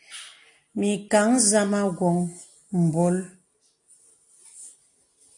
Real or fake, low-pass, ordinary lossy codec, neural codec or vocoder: real; 10.8 kHz; AAC, 48 kbps; none